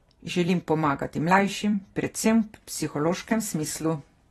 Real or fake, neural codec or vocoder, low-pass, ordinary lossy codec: fake; vocoder, 44.1 kHz, 128 mel bands every 256 samples, BigVGAN v2; 19.8 kHz; AAC, 32 kbps